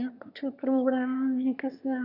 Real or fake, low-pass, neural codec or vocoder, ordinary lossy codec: fake; 5.4 kHz; autoencoder, 22.05 kHz, a latent of 192 numbers a frame, VITS, trained on one speaker; none